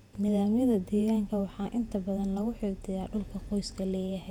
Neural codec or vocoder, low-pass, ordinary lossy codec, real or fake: vocoder, 48 kHz, 128 mel bands, Vocos; 19.8 kHz; none; fake